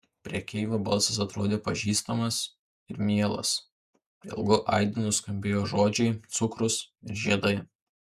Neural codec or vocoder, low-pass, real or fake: vocoder, 48 kHz, 128 mel bands, Vocos; 14.4 kHz; fake